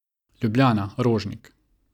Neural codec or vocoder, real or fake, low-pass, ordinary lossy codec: none; real; 19.8 kHz; none